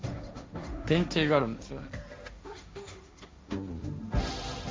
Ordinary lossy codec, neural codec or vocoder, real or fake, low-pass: none; codec, 16 kHz, 1.1 kbps, Voila-Tokenizer; fake; none